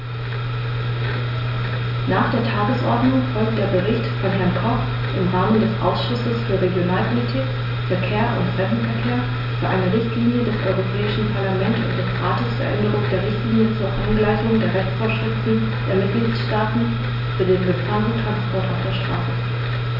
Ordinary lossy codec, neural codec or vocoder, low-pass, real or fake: none; none; 5.4 kHz; real